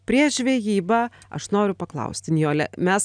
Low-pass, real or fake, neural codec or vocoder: 9.9 kHz; real; none